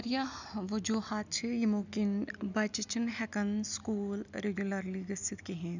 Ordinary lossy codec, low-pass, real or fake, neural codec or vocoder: none; 7.2 kHz; real; none